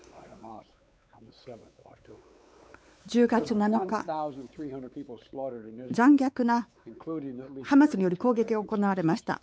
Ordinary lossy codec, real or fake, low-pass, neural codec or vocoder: none; fake; none; codec, 16 kHz, 4 kbps, X-Codec, WavLM features, trained on Multilingual LibriSpeech